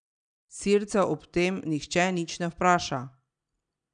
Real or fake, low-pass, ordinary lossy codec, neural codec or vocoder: real; 9.9 kHz; none; none